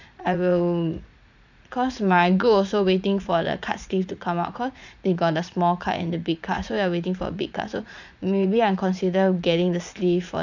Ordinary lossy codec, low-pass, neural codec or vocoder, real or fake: none; 7.2 kHz; vocoder, 44.1 kHz, 80 mel bands, Vocos; fake